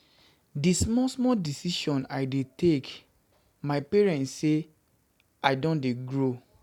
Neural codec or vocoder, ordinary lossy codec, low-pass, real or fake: none; none; 19.8 kHz; real